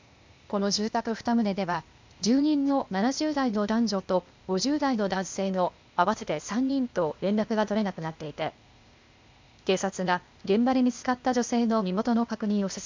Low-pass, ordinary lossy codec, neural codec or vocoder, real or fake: 7.2 kHz; MP3, 64 kbps; codec, 16 kHz, 0.8 kbps, ZipCodec; fake